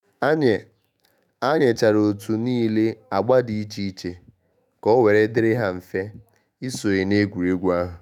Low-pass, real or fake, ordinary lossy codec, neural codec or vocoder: 19.8 kHz; fake; none; autoencoder, 48 kHz, 128 numbers a frame, DAC-VAE, trained on Japanese speech